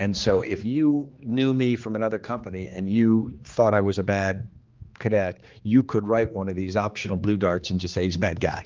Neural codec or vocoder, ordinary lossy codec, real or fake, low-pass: codec, 16 kHz, 2 kbps, X-Codec, HuBERT features, trained on general audio; Opus, 24 kbps; fake; 7.2 kHz